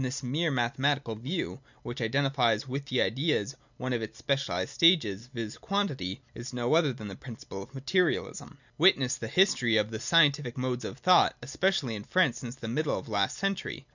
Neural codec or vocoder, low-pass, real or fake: none; 7.2 kHz; real